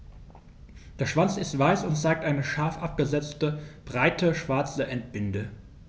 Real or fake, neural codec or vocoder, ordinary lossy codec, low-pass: real; none; none; none